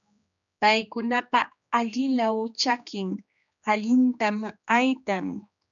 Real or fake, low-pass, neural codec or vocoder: fake; 7.2 kHz; codec, 16 kHz, 2 kbps, X-Codec, HuBERT features, trained on general audio